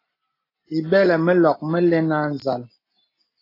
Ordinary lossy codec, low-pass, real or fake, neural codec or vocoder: AAC, 24 kbps; 5.4 kHz; real; none